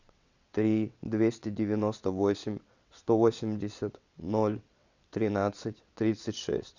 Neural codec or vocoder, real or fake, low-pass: vocoder, 22.05 kHz, 80 mel bands, WaveNeXt; fake; 7.2 kHz